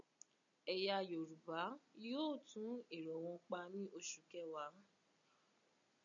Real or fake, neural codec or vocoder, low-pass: real; none; 7.2 kHz